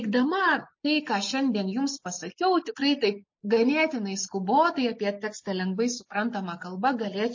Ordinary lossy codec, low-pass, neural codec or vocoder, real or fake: MP3, 32 kbps; 7.2 kHz; none; real